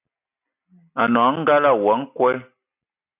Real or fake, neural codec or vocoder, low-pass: real; none; 3.6 kHz